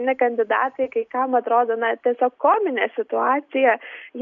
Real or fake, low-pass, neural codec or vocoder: real; 7.2 kHz; none